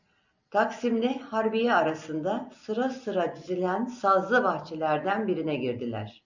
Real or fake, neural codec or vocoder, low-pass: real; none; 7.2 kHz